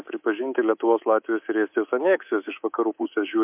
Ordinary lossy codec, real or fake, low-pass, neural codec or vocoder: MP3, 32 kbps; fake; 3.6 kHz; codec, 24 kHz, 3.1 kbps, DualCodec